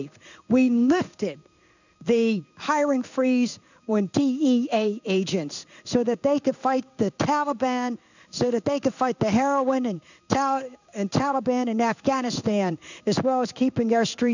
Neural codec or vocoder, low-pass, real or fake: codec, 16 kHz in and 24 kHz out, 1 kbps, XY-Tokenizer; 7.2 kHz; fake